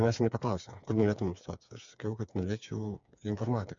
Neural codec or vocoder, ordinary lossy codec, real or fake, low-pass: codec, 16 kHz, 4 kbps, FreqCodec, smaller model; MP3, 64 kbps; fake; 7.2 kHz